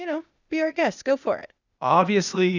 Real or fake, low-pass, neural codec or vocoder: fake; 7.2 kHz; codec, 16 kHz, 0.8 kbps, ZipCodec